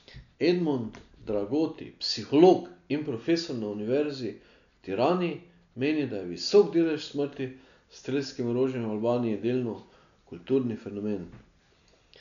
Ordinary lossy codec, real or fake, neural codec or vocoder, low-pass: none; real; none; 7.2 kHz